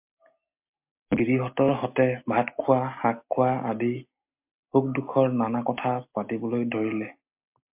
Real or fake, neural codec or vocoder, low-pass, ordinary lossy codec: real; none; 3.6 kHz; MP3, 32 kbps